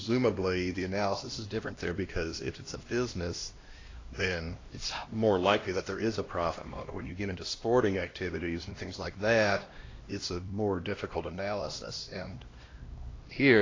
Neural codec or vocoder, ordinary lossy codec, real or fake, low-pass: codec, 16 kHz, 1 kbps, X-Codec, HuBERT features, trained on LibriSpeech; AAC, 32 kbps; fake; 7.2 kHz